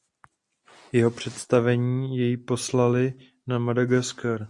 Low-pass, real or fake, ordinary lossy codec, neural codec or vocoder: 10.8 kHz; real; Opus, 64 kbps; none